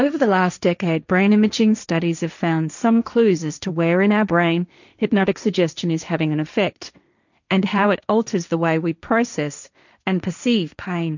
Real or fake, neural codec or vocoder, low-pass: fake; codec, 16 kHz, 1.1 kbps, Voila-Tokenizer; 7.2 kHz